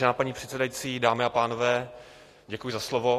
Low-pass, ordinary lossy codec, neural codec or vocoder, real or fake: 14.4 kHz; AAC, 48 kbps; none; real